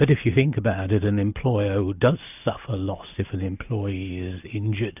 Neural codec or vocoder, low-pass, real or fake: none; 3.6 kHz; real